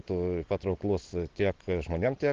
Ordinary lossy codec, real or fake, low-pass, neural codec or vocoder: Opus, 16 kbps; real; 7.2 kHz; none